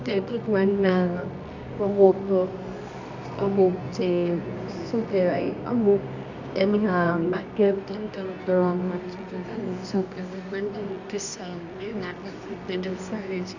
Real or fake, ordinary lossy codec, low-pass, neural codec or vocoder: fake; none; 7.2 kHz; codec, 24 kHz, 0.9 kbps, WavTokenizer, medium music audio release